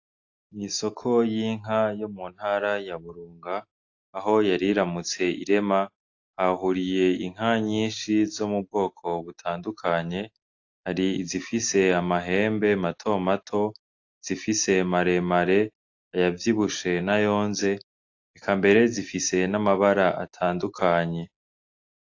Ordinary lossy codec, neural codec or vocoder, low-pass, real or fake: AAC, 48 kbps; none; 7.2 kHz; real